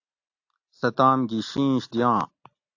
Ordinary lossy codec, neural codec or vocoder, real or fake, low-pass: AAC, 48 kbps; none; real; 7.2 kHz